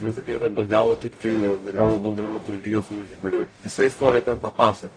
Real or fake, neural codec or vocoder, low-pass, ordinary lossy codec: fake; codec, 44.1 kHz, 0.9 kbps, DAC; 9.9 kHz; AAC, 48 kbps